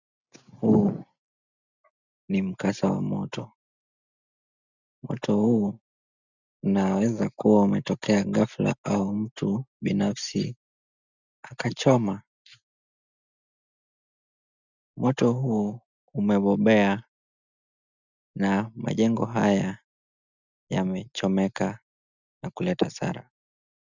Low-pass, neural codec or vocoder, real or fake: 7.2 kHz; none; real